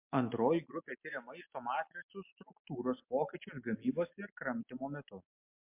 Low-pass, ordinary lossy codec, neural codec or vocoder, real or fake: 3.6 kHz; MP3, 32 kbps; none; real